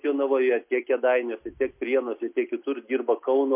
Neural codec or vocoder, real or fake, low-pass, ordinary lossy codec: none; real; 3.6 kHz; MP3, 32 kbps